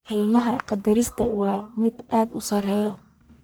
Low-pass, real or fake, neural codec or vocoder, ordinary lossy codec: none; fake; codec, 44.1 kHz, 1.7 kbps, Pupu-Codec; none